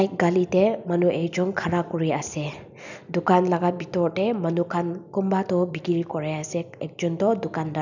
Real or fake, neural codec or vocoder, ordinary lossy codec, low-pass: real; none; none; 7.2 kHz